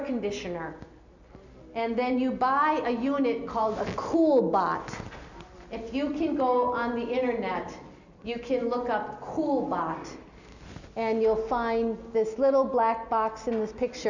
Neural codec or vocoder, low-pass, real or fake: none; 7.2 kHz; real